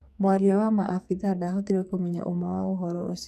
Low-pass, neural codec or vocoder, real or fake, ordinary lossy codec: 14.4 kHz; codec, 44.1 kHz, 2.6 kbps, SNAC; fake; none